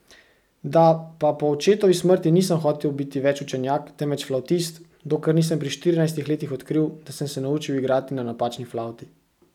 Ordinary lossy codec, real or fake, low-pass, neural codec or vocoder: none; real; 19.8 kHz; none